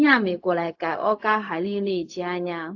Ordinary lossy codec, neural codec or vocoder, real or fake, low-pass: AAC, 48 kbps; codec, 16 kHz, 0.4 kbps, LongCat-Audio-Codec; fake; 7.2 kHz